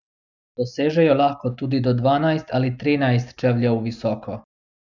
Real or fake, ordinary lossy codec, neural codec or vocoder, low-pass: real; none; none; 7.2 kHz